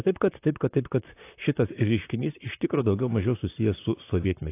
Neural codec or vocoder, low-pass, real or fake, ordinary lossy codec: vocoder, 44.1 kHz, 128 mel bands, Pupu-Vocoder; 3.6 kHz; fake; AAC, 24 kbps